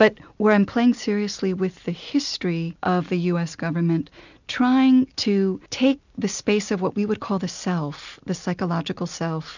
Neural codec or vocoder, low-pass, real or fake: none; 7.2 kHz; real